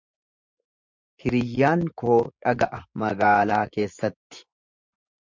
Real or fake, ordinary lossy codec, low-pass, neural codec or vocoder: fake; MP3, 64 kbps; 7.2 kHz; vocoder, 24 kHz, 100 mel bands, Vocos